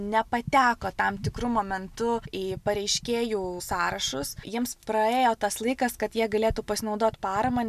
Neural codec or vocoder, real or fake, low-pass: none; real; 14.4 kHz